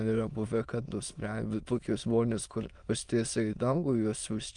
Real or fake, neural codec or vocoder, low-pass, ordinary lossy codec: fake; autoencoder, 22.05 kHz, a latent of 192 numbers a frame, VITS, trained on many speakers; 9.9 kHz; Opus, 32 kbps